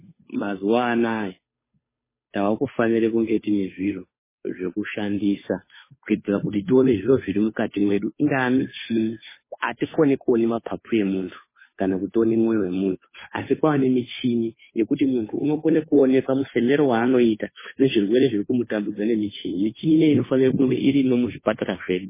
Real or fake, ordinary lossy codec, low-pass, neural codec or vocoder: fake; MP3, 16 kbps; 3.6 kHz; codec, 16 kHz, 2 kbps, FunCodec, trained on Chinese and English, 25 frames a second